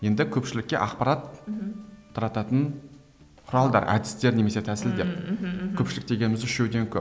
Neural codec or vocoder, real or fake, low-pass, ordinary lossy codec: none; real; none; none